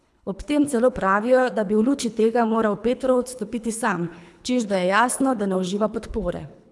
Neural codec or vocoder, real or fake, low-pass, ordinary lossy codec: codec, 24 kHz, 3 kbps, HILCodec; fake; none; none